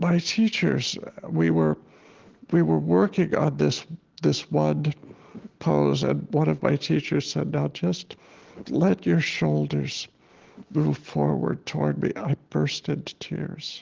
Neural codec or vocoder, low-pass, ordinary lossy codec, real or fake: none; 7.2 kHz; Opus, 16 kbps; real